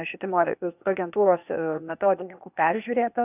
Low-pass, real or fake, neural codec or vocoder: 3.6 kHz; fake; codec, 16 kHz, 0.8 kbps, ZipCodec